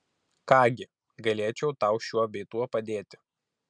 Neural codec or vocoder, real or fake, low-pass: none; real; 9.9 kHz